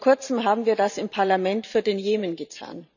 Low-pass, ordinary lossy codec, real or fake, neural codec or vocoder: 7.2 kHz; none; fake; vocoder, 44.1 kHz, 128 mel bands every 512 samples, BigVGAN v2